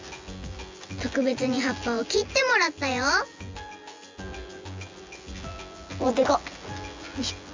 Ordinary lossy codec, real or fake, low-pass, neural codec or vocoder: MP3, 64 kbps; fake; 7.2 kHz; vocoder, 24 kHz, 100 mel bands, Vocos